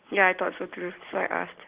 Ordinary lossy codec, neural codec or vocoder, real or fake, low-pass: Opus, 16 kbps; none; real; 3.6 kHz